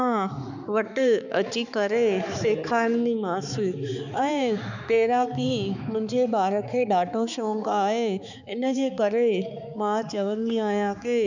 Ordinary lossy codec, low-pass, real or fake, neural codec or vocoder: none; 7.2 kHz; fake; codec, 16 kHz, 4 kbps, X-Codec, HuBERT features, trained on balanced general audio